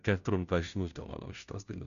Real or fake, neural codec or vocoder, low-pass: fake; codec, 16 kHz, 0.5 kbps, FunCodec, trained on Chinese and English, 25 frames a second; 7.2 kHz